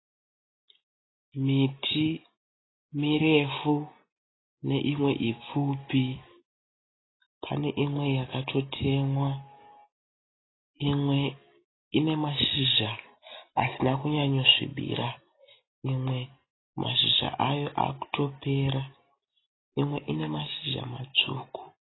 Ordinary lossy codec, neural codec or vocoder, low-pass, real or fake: AAC, 16 kbps; none; 7.2 kHz; real